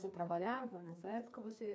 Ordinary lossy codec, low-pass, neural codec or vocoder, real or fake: none; none; codec, 16 kHz, 2 kbps, FreqCodec, larger model; fake